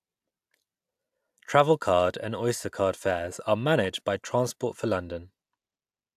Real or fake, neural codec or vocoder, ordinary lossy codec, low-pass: real; none; AAC, 96 kbps; 14.4 kHz